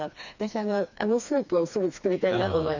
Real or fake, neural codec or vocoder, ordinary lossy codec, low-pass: fake; codec, 16 kHz, 2 kbps, FreqCodec, smaller model; none; 7.2 kHz